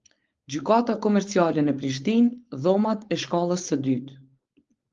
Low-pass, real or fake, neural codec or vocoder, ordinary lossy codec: 7.2 kHz; fake; codec, 16 kHz, 4.8 kbps, FACodec; Opus, 32 kbps